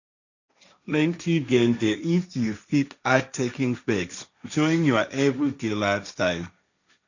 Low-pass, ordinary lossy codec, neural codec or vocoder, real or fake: 7.2 kHz; none; codec, 16 kHz, 1.1 kbps, Voila-Tokenizer; fake